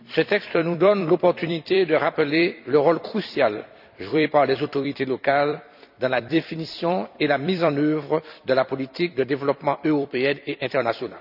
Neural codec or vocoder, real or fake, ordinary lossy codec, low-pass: vocoder, 44.1 kHz, 128 mel bands every 256 samples, BigVGAN v2; fake; none; 5.4 kHz